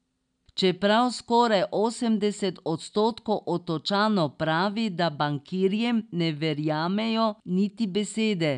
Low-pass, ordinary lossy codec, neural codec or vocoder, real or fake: 9.9 kHz; none; none; real